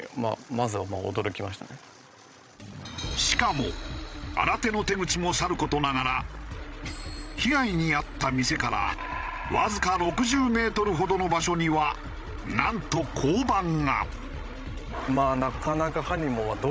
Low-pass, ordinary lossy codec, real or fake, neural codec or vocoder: none; none; fake; codec, 16 kHz, 16 kbps, FreqCodec, larger model